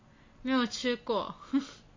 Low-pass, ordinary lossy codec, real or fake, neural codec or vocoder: 7.2 kHz; MP3, 32 kbps; real; none